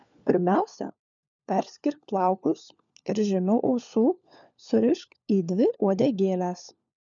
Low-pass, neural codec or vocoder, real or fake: 7.2 kHz; codec, 16 kHz, 4 kbps, FunCodec, trained on LibriTTS, 50 frames a second; fake